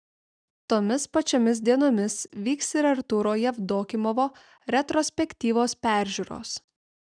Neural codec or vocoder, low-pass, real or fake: none; 9.9 kHz; real